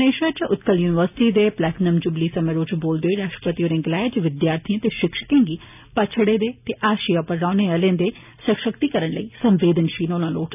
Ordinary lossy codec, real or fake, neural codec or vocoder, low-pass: none; real; none; 3.6 kHz